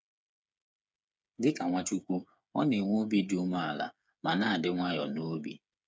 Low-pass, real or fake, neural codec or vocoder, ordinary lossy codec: none; fake; codec, 16 kHz, 8 kbps, FreqCodec, smaller model; none